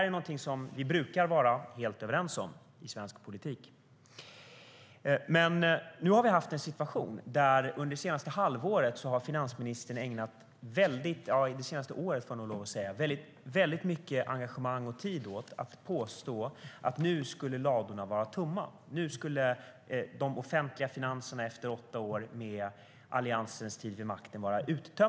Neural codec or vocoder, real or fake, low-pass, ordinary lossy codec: none; real; none; none